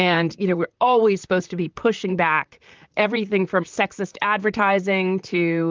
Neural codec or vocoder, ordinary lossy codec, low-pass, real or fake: vocoder, 44.1 kHz, 128 mel bands, Pupu-Vocoder; Opus, 24 kbps; 7.2 kHz; fake